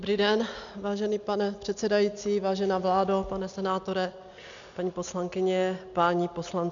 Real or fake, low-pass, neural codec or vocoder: real; 7.2 kHz; none